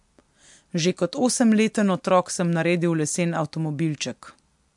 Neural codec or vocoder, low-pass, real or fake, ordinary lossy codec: none; 10.8 kHz; real; MP3, 64 kbps